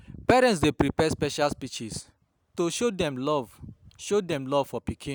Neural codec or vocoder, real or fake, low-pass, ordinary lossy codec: none; real; none; none